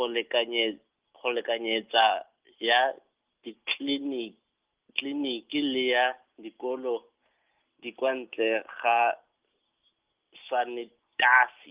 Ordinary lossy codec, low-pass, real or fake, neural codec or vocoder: Opus, 32 kbps; 3.6 kHz; real; none